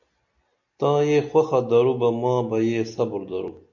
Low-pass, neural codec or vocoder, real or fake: 7.2 kHz; none; real